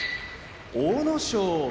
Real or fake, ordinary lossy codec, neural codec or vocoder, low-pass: real; none; none; none